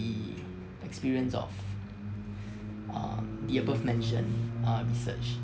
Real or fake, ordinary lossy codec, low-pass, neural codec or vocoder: real; none; none; none